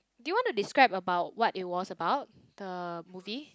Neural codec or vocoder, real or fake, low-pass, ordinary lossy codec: none; real; none; none